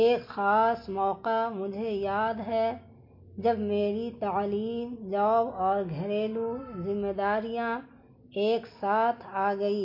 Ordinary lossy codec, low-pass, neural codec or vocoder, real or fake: MP3, 32 kbps; 5.4 kHz; none; real